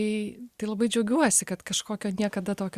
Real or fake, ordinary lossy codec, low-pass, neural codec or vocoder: real; Opus, 64 kbps; 14.4 kHz; none